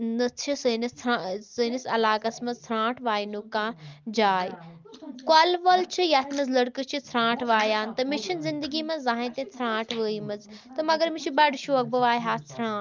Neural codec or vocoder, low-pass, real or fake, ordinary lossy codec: none; 7.2 kHz; real; Opus, 32 kbps